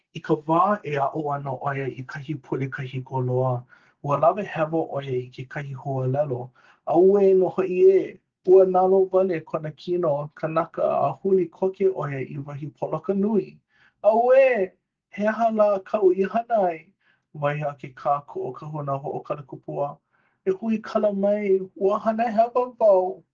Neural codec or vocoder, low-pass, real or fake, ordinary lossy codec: none; 7.2 kHz; real; Opus, 16 kbps